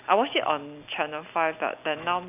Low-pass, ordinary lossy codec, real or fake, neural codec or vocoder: 3.6 kHz; none; real; none